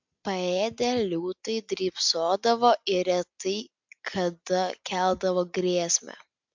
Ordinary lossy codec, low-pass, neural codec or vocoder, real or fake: MP3, 64 kbps; 7.2 kHz; none; real